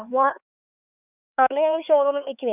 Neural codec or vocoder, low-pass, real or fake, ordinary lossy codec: codec, 16 kHz, 4 kbps, X-Codec, HuBERT features, trained on LibriSpeech; 3.6 kHz; fake; none